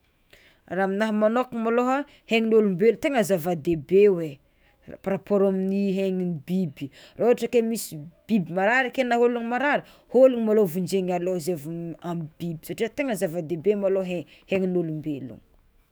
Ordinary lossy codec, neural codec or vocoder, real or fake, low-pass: none; autoencoder, 48 kHz, 128 numbers a frame, DAC-VAE, trained on Japanese speech; fake; none